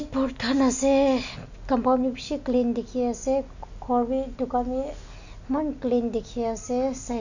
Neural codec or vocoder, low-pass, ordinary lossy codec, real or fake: none; 7.2 kHz; none; real